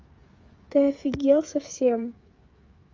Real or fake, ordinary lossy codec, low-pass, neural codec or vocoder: fake; Opus, 32 kbps; 7.2 kHz; codec, 16 kHz, 8 kbps, FreqCodec, smaller model